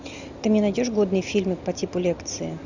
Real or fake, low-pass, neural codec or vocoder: real; 7.2 kHz; none